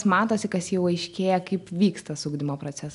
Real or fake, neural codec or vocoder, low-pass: real; none; 10.8 kHz